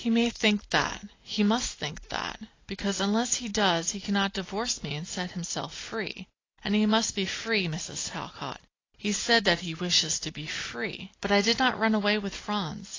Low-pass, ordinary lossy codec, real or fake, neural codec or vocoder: 7.2 kHz; AAC, 32 kbps; real; none